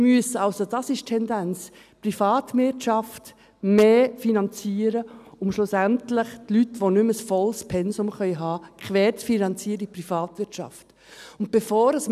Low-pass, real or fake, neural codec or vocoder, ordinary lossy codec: 14.4 kHz; real; none; none